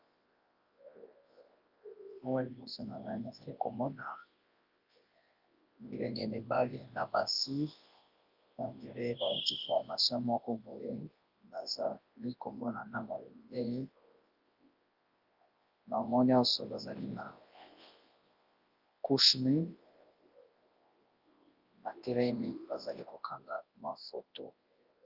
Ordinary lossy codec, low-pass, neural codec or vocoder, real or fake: Opus, 24 kbps; 5.4 kHz; codec, 24 kHz, 0.9 kbps, WavTokenizer, large speech release; fake